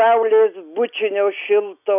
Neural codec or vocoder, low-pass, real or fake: none; 3.6 kHz; real